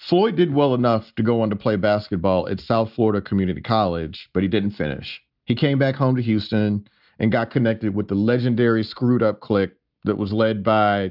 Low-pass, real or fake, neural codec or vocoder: 5.4 kHz; real; none